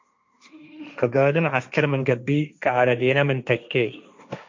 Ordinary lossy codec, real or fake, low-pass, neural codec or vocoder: MP3, 48 kbps; fake; 7.2 kHz; codec, 16 kHz, 1.1 kbps, Voila-Tokenizer